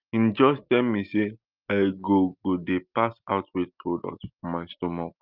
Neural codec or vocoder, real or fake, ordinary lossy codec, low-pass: none; real; Opus, 24 kbps; 5.4 kHz